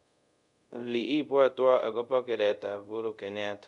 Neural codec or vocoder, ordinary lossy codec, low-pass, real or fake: codec, 24 kHz, 0.5 kbps, DualCodec; none; 10.8 kHz; fake